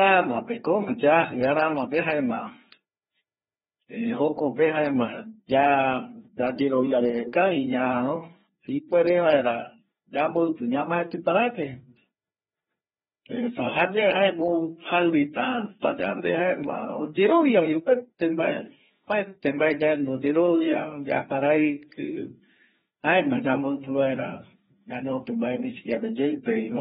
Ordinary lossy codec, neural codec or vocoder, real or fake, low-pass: AAC, 16 kbps; codec, 16 kHz, 2 kbps, FreqCodec, larger model; fake; 7.2 kHz